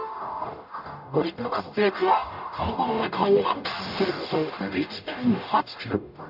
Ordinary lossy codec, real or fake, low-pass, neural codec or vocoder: AAC, 48 kbps; fake; 5.4 kHz; codec, 44.1 kHz, 0.9 kbps, DAC